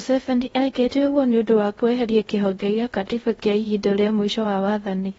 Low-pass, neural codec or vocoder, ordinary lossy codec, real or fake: 10.8 kHz; codec, 16 kHz in and 24 kHz out, 0.6 kbps, FocalCodec, streaming, 2048 codes; AAC, 24 kbps; fake